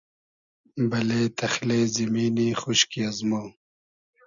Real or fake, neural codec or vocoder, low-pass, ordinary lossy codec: real; none; 7.2 kHz; MP3, 96 kbps